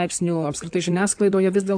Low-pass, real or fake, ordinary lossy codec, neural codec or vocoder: 9.9 kHz; fake; AAC, 64 kbps; codec, 16 kHz in and 24 kHz out, 2.2 kbps, FireRedTTS-2 codec